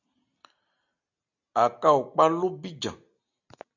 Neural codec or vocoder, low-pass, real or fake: none; 7.2 kHz; real